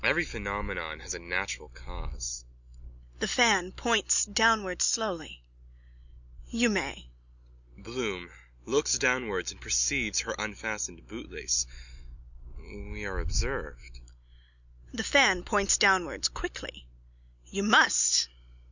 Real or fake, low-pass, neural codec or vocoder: real; 7.2 kHz; none